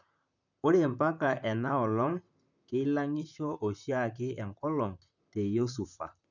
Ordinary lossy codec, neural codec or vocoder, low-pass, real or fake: none; vocoder, 44.1 kHz, 128 mel bands, Pupu-Vocoder; 7.2 kHz; fake